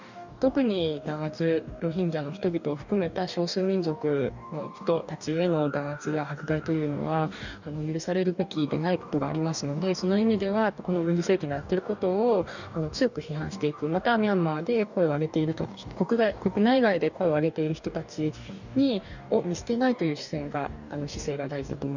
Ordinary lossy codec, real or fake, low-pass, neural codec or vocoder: none; fake; 7.2 kHz; codec, 44.1 kHz, 2.6 kbps, DAC